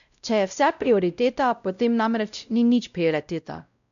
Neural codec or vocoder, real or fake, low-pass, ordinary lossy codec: codec, 16 kHz, 0.5 kbps, X-Codec, WavLM features, trained on Multilingual LibriSpeech; fake; 7.2 kHz; none